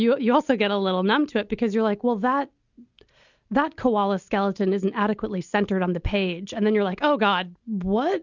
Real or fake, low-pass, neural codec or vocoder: real; 7.2 kHz; none